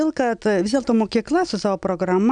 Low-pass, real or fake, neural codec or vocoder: 9.9 kHz; real; none